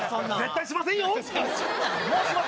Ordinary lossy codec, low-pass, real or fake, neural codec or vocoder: none; none; real; none